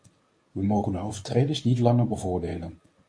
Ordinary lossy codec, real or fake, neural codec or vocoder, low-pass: MP3, 48 kbps; fake; codec, 24 kHz, 0.9 kbps, WavTokenizer, medium speech release version 1; 9.9 kHz